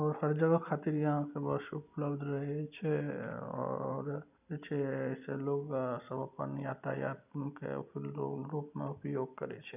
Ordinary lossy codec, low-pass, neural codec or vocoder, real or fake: none; 3.6 kHz; none; real